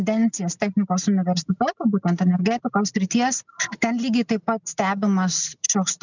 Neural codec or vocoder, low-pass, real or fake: none; 7.2 kHz; real